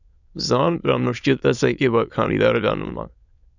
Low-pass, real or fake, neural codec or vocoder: 7.2 kHz; fake; autoencoder, 22.05 kHz, a latent of 192 numbers a frame, VITS, trained on many speakers